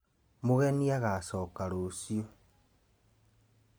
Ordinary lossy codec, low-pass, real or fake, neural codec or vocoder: none; none; real; none